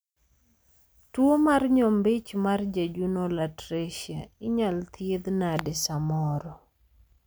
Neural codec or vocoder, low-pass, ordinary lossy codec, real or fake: none; none; none; real